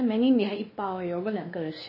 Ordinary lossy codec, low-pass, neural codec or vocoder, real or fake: MP3, 24 kbps; 5.4 kHz; codec, 16 kHz, 2 kbps, X-Codec, WavLM features, trained on Multilingual LibriSpeech; fake